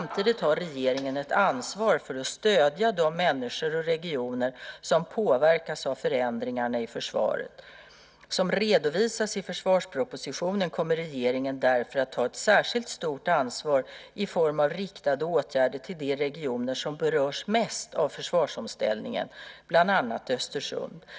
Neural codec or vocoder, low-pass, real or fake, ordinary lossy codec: none; none; real; none